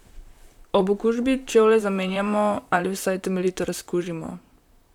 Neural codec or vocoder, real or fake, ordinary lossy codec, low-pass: vocoder, 44.1 kHz, 128 mel bands, Pupu-Vocoder; fake; none; 19.8 kHz